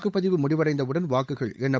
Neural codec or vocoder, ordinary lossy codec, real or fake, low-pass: codec, 16 kHz, 8 kbps, FunCodec, trained on Chinese and English, 25 frames a second; none; fake; none